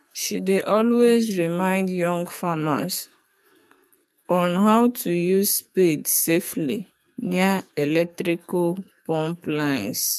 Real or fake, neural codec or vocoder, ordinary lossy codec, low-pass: fake; codec, 44.1 kHz, 2.6 kbps, SNAC; MP3, 96 kbps; 14.4 kHz